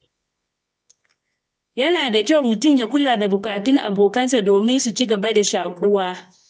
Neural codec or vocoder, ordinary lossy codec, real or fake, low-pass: codec, 24 kHz, 0.9 kbps, WavTokenizer, medium music audio release; none; fake; none